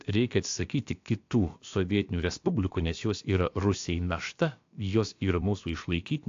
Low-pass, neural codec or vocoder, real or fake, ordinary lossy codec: 7.2 kHz; codec, 16 kHz, about 1 kbps, DyCAST, with the encoder's durations; fake; AAC, 48 kbps